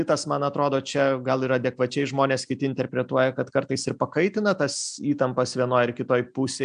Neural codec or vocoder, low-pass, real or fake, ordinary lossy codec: none; 9.9 kHz; real; AAC, 96 kbps